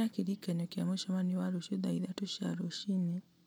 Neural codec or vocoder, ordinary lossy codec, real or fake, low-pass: none; none; real; none